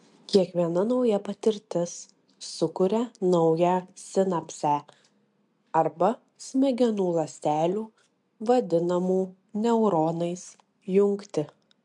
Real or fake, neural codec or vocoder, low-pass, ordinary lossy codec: real; none; 10.8 kHz; MP3, 64 kbps